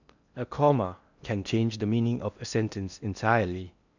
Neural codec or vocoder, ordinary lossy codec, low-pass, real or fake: codec, 16 kHz in and 24 kHz out, 0.8 kbps, FocalCodec, streaming, 65536 codes; none; 7.2 kHz; fake